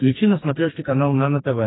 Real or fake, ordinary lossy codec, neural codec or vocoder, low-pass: fake; AAC, 16 kbps; codec, 44.1 kHz, 2.6 kbps, SNAC; 7.2 kHz